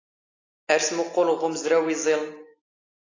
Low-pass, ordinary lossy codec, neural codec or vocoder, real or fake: 7.2 kHz; AAC, 32 kbps; none; real